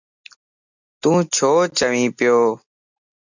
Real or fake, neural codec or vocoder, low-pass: real; none; 7.2 kHz